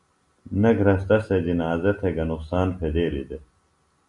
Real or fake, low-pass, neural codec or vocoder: real; 10.8 kHz; none